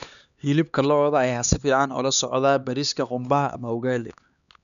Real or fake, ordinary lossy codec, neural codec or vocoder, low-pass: fake; none; codec, 16 kHz, 2 kbps, X-Codec, HuBERT features, trained on LibriSpeech; 7.2 kHz